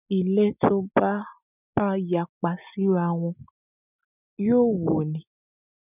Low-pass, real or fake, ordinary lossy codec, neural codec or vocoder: 3.6 kHz; real; none; none